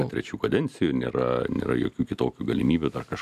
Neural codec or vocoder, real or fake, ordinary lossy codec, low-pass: none; real; MP3, 96 kbps; 14.4 kHz